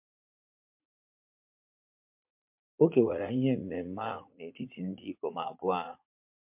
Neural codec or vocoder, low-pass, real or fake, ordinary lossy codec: vocoder, 44.1 kHz, 80 mel bands, Vocos; 3.6 kHz; fake; MP3, 32 kbps